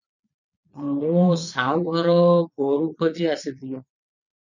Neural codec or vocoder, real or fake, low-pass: vocoder, 22.05 kHz, 80 mel bands, Vocos; fake; 7.2 kHz